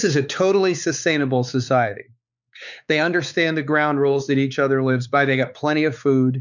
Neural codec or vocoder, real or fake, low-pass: codec, 16 kHz, 4 kbps, X-Codec, WavLM features, trained on Multilingual LibriSpeech; fake; 7.2 kHz